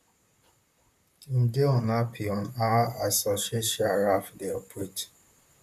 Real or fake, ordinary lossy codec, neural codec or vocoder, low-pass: fake; none; vocoder, 44.1 kHz, 128 mel bands, Pupu-Vocoder; 14.4 kHz